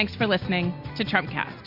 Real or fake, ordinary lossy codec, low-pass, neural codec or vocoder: real; MP3, 48 kbps; 5.4 kHz; none